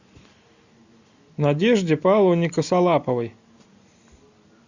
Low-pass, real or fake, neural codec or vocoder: 7.2 kHz; real; none